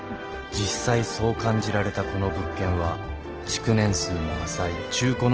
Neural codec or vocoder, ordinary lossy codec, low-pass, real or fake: none; Opus, 16 kbps; 7.2 kHz; real